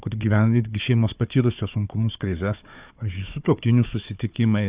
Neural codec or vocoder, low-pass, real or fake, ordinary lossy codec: codec, 16 kHz, 4 kbps, FunCodec, trained on Chinese and English, 50 frames a second; 3.6 kHz; fake; Opus, 64 kbps